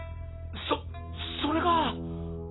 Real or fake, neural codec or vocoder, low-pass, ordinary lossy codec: real; none; 7.2 kHz; AAC, 16 kbps